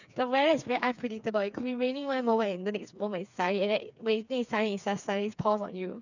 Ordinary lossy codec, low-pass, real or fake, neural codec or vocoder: none; 7.2 kHz; fake; codec, 16 kHz, 4 kbps, FreqCodec, smaller model